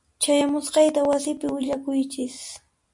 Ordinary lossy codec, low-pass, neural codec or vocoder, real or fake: MP3, 64 kbps; 10.8 kHz; none; real